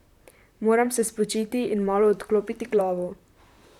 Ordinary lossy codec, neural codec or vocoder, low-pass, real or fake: none; vocoder, 44.1 kHz, 128 mel bands, Pupu-Vocoder; 19.8 kHz; fake